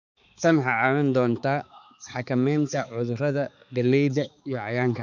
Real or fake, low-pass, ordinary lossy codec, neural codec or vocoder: fake; 7.2 kHz; none; codec, 16 kHz, 4 kbps, X-Codec, HuBERT features, trained on balanced general audio